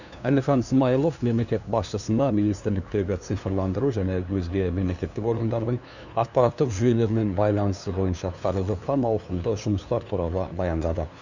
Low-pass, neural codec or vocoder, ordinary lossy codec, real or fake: 7.2 kHz; codec, 16 kHz, 2 kbps, FunCodec, trained on LibriTTS, 25 frames a second; none; fake